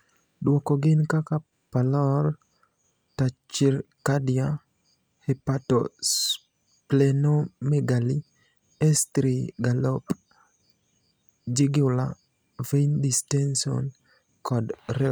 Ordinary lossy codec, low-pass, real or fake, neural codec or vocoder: none; none; real; none